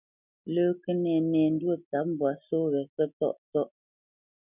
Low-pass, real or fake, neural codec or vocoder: 3.6 kHz; real; none